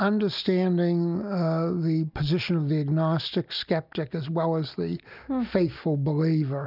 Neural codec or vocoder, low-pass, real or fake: none; 5.4 kHz; real